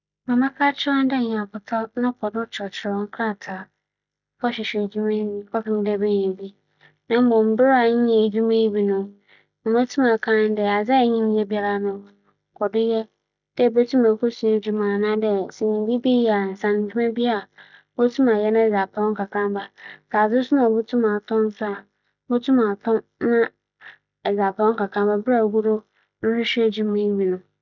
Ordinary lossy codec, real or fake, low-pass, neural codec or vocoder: none; real; 7.2 kHz; none